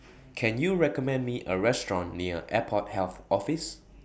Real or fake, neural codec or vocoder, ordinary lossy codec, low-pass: real; none; none; none